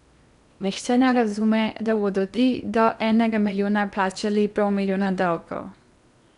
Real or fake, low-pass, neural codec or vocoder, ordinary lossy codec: fake; 10.8 kHz; codec, 16 kHz in and 24 kHz out, 0.8 kbps, FocalCodec, streaming, 65536 codes; none